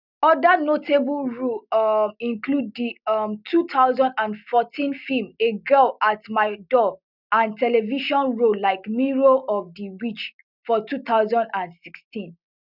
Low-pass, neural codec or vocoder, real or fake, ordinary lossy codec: 5.4 kHz; none; real; none